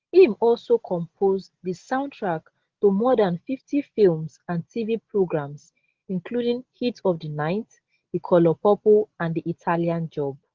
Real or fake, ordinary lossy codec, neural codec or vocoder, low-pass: real; Opus, 16 kbps; none; 7.2 kHz